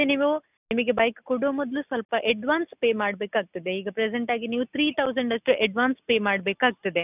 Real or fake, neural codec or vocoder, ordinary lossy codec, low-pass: real; none; none; 3.6 kHz